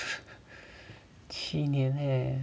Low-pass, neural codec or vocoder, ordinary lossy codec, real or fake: none; none; none; real